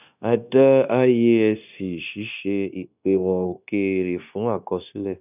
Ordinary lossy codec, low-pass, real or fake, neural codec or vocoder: none; 3.6 kHz; fake; codec, 16 kHz, 0.9 kbps, LongCat-Audio-Codec